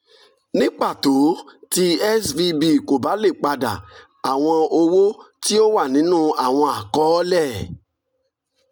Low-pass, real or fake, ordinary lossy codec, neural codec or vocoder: none; real; none; none